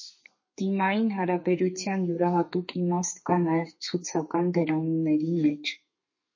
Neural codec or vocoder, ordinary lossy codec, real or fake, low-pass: codec, 32 kHz, 1.9 kbps, SNAC; MP3, 32 kbps; fake; 7.2 kHz